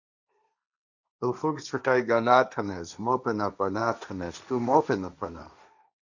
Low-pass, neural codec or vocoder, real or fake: 7.2 kHz; codec, 16 kHz, 1.1 kbps, Voila-Tokenizer; fake